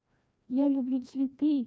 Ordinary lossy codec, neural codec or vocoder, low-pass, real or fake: none; codec, 16 kHz, 1 kbps, FreqCodec, larger model; none; fake